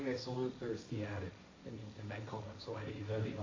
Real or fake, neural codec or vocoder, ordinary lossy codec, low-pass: fake; codec, 16 kHz, 1.1 kbps, Voila-Tokenizer; AAC, 32 kbps; 7.2 kHz